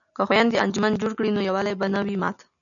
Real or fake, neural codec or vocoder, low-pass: real; none; 7.2 kHz